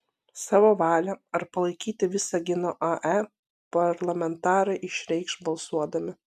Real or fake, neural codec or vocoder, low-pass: real; none; 14.4 kHz